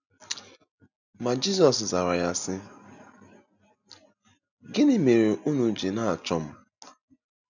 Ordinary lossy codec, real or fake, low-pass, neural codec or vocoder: none; real; 7.2 kHz; none